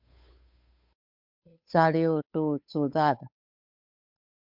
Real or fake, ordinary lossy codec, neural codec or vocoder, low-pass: fake; MP3, 48 kbps; codec, 16 kHz, 8 kbps, FunCodec, trained on Chinese and English, 25 frames a second; 5.4 kHz